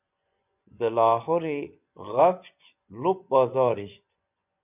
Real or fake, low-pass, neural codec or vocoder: fake; 3.6 kHz; vocoder, 24 kHz, 100 mel bands, Vocos